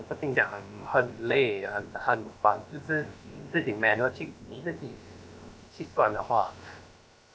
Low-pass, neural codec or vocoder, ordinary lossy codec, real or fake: none; codec, 16 kHz, about 1 kbps, DyCAST, with the encoder's durations; none; fake